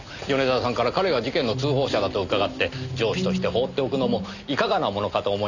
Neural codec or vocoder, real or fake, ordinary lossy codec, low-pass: none; real; none; 7.2 kHz